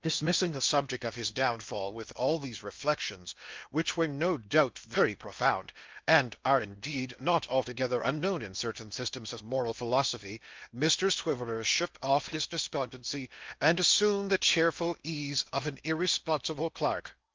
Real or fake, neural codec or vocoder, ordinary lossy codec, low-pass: fake; codec, 16 kHz in and 24 kHz out, 0.6 kbps, FocalCodec, streaming, 2048 codes; Opus, 24 kbps; 7.2 kHz